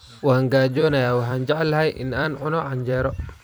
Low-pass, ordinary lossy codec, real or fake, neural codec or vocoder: none; none; fake; vocoder, 44.1 kHz, 128 mel bands every 256 samples, BigVGAN v2